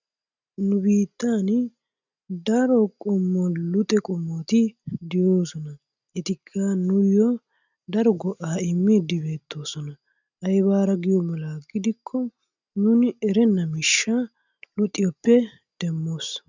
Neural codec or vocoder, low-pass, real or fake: none; 7.2 kHz; real